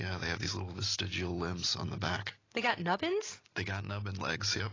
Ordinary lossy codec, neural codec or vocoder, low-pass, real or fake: AAC, 32 kbps; none; 7.2 kHz; real